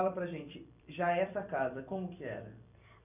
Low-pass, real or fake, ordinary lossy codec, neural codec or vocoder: 3.6 kHz; fake; none; vocoder, 44.1 kHz, 128 mel bands every 256 samples, BigVGAN v2